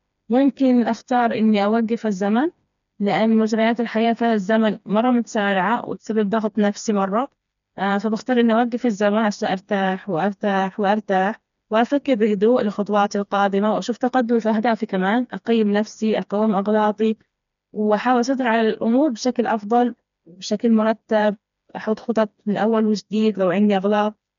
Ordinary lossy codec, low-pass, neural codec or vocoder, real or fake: none; 7.2 kHz; codec, 16 kHz, 2 kbps, FreqCodec, smaller model; fake